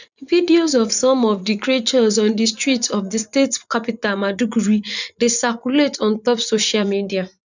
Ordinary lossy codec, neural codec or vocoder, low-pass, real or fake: none; vocoder, 24 kHz, 100 mel bands, Vocos; 7.2 kHz; fake